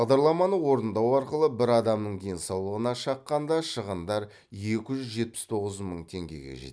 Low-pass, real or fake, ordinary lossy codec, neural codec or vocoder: none; real; none; none